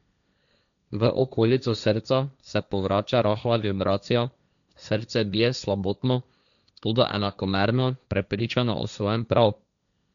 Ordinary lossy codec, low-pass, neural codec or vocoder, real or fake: none; 7.2 kHz; codec, 16 kHz, 1.1 kbps, Voila-Tokenizer; fake